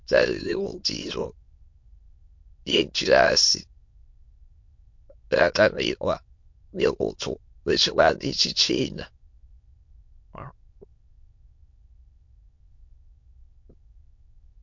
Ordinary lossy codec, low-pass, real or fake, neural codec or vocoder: MP3, 48 kbps; 7.2 kHz; fake; autoencoder, 22.05 kHz, a latent of 192 numbers a frame, VITS, trained on many speakers